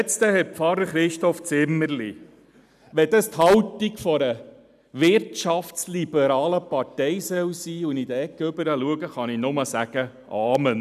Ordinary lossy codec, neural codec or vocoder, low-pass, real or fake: none; none; 14.4 kHz; real